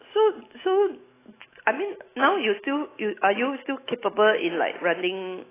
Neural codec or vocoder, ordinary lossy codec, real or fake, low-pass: autoencoder, 48 kHz, 128 numbers a frame, DAC-VAE, trained on Japanese speech; AAC, 16 kbps; fake; 3.6 kHz